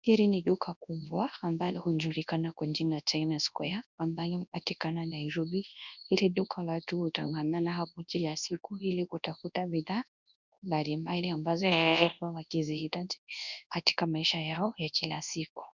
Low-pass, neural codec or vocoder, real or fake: 7.2 kHz; codec, 24 kHz, 0.9 kbps, WavTokenizer, large speech release; fake